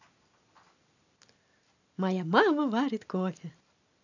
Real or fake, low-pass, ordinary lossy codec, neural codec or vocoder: real; 7.2 kHz; none; none